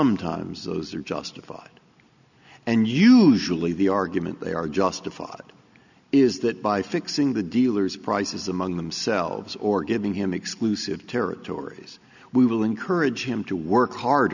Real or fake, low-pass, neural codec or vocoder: real; 7.2 kHz; none